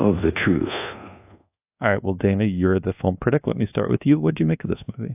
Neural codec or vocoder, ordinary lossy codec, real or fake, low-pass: codec, 24 kHz, 1.2 kbps, DualCodec; AAC, 32 kbps; fake; 3.6 kHz